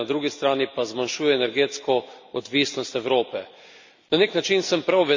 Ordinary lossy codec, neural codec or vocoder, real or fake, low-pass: MP3, 64 kbps; none; real; 7.2 kHz